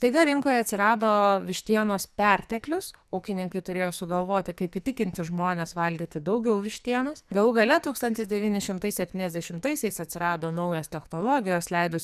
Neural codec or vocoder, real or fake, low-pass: codec, 44.1 kHz, 2.6 kbps, SNAC; fake; 14.4 kHz